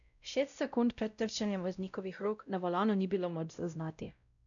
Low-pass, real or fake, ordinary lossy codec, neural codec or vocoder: 7.2 kHz; fake; none; codec, 16 kHz, 0.5 kbps, X-Codec, WavLM features, trained on Multilingual LibriSpeech